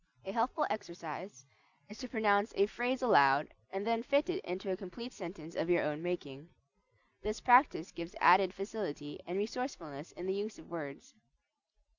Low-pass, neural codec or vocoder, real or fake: 7.2 kHz; none; real